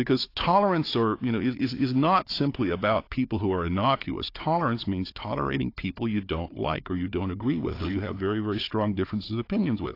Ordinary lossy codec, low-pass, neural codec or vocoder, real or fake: AAC, 32 kbps; 5.4 kHz; codec, 16 kHz, 4 kbps, FunCodec, trained on Chinese and English, 50 frames a second; fake